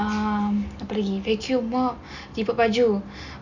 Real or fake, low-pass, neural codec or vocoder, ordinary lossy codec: real; 7.2 kHz; none; none